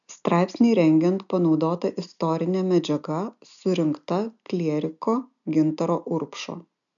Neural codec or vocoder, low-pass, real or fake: none; 7.2 kHz; real